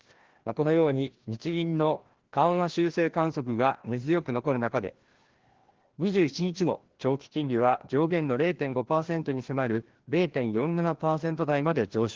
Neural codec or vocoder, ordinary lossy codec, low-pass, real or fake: codec, 16 kHz, 1 kbps, FreqCodec, larger model; Opus, 16 kbps; 7.2 kHz; fake